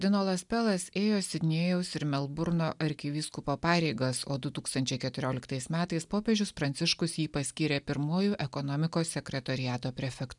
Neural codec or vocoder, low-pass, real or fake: none; 10.8 kHz; real